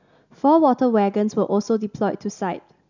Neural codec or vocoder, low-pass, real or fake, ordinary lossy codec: none; 7.2 kHz; real; none